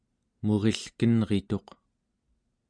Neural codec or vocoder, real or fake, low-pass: none; real; 9.9 kHz